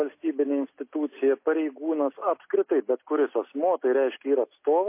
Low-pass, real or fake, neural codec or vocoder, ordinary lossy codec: 3.6 kHz; real; none; MP3, 24 kbps